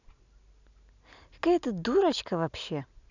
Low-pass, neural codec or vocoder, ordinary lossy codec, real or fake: 7.2 kHz; none; none; real